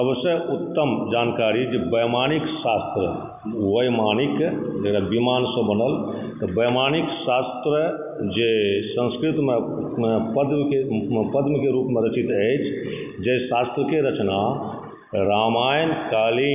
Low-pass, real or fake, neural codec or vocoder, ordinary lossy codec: 3.6 kHz; real; none; none